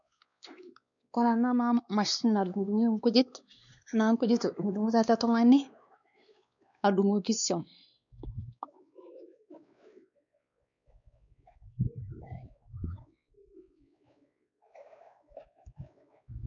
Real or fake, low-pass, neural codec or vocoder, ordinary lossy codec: fake; 7.2 kHz; codec, 16 kHz, 2 kbps, X-Codec, WavLM features, trained on Multilingual LibriSpeech; none